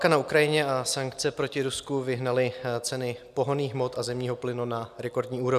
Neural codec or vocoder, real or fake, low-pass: none; real; 14.4 kHz